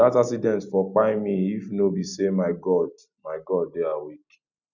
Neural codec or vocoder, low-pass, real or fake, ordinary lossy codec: none; 7.2 kHz; real; none